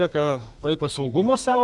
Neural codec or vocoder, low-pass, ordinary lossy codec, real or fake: codec, 32 kHz, 1.9 kbps, SNAC; 10.8 kHz; Opus, 64 kbps; fake